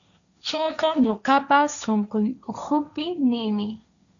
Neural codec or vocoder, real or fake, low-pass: codec, 16 kHz, 1.1 kbps, Voila-Tokenizer; fake; 7.2 kHz